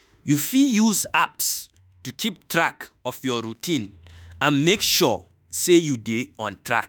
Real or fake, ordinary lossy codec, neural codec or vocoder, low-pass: fake; none; autoencoder, 48 kHz, 32 numbers a frame, DAC-VAE, trained on Japanese speech; none